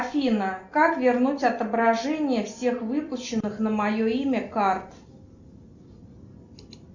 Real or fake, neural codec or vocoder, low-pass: real; none; 7.2 kHz